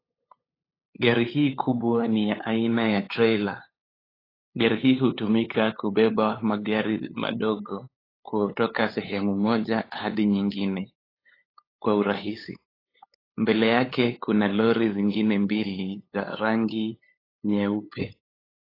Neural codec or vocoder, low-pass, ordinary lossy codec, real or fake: codec, 16 kHz, 8 kbps, FunCodec, trained on LibriTTS, 25 frames a second; 5.4 kHz; AAC, 24 kbps; fake